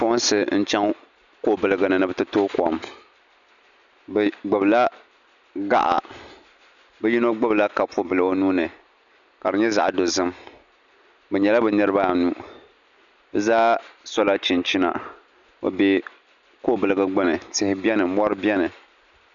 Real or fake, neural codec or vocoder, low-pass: real; none; 7.2 kHz